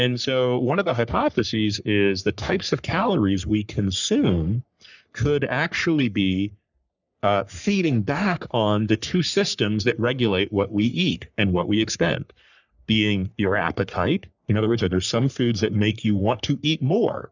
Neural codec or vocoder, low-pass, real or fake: codec, 44.1 kHz, 3.4 kbps, Pupu-Codec; 7.2 kHz; fake